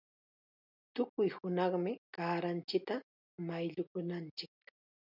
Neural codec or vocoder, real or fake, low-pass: none; real; 5.4 kHz